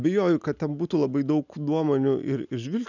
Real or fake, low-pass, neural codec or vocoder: real; 7.2 kHz; none